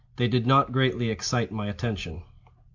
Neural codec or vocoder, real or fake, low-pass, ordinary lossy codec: none; real; 7.2 kHz; AAC, 48 kbps